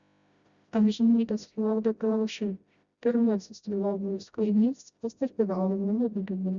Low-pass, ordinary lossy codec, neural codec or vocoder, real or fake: 7.2 kHz; Opus, 64 kbps; codec, 16 kHz, 0.5 kbps, FreqCodec, smaller model; fake